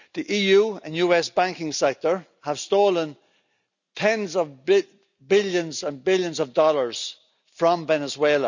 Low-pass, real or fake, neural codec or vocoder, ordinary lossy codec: 7.2 kHz; real; none; none